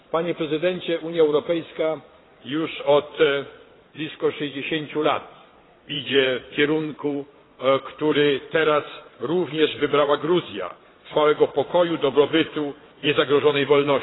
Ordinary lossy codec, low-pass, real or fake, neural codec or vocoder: AAC, 16 kbps; 7.2 kHz; fake; vocoder, 22.05 kHz, 80 mel bands, Vocos